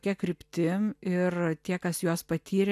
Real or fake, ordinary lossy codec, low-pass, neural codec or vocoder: real; AAC, 96 kbps; 14.4 kHz; none